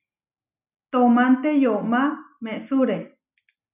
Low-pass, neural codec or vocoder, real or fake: 3.6 kHz; none; real